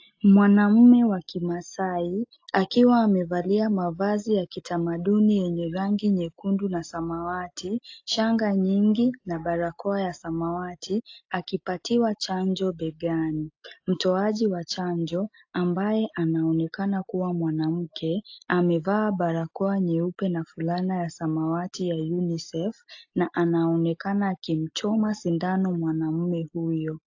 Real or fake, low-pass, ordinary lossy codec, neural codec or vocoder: real; 7.2 kHz; AAC, 48 kbps; none